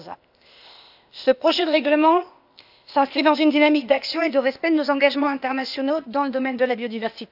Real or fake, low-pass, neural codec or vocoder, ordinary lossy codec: fake; 5.4 kHz; codec, 16 kHz, 0.8 kbps, ZipCodec; AAC, 48 kbps